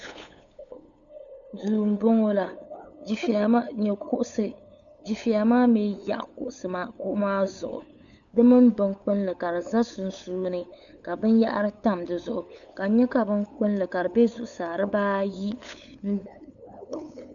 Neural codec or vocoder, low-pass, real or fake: codec, 16 kHz, 8 kbps, FunCodec, trained on LibriTTS, 25 frames a second; 7.2 kHz; fake